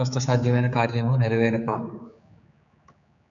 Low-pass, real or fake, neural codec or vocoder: 7.2 kHz; fake; codec, 16 kHz, 4 kbps, X-Codec, HuBERT features, trained on general audio